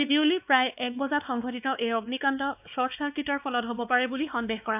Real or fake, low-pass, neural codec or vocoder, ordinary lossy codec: fake; 3.6 kHz; codec, 16 kHz, 2 kbps, X-Codec, WavLM features, trained on Multilingual LibriSpeech; none